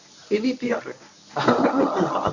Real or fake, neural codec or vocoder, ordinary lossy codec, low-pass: fake; codec, 24 kHz, 0.9 kbps, WavTokenizer, medium speech release version 1; none; 7.2 kHz